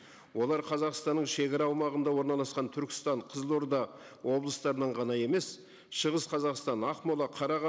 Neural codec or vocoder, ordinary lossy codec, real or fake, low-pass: none; none; real; none